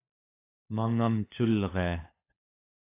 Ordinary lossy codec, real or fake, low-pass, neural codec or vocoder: AAC, 24 kbps; fake; 3.6 kHz; codec, 16 kHz, 4 kbps, FunCodec, trained on LibriTTS, 50 frames a second